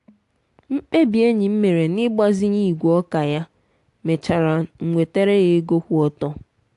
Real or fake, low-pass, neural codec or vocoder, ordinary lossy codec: real; 10.8 kHz; none; AAC, 64 kbps